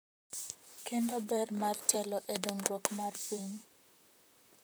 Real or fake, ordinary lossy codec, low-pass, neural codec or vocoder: fake; none; none; vocoder, 44.1 kHz, 128 mel bands, Pupu-Vocoder